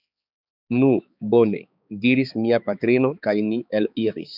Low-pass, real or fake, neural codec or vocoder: 5.4 kHz; fake; codec, 16 kHz, 4 kbps, X-Codec, HuBERT features, trained on balanced general audio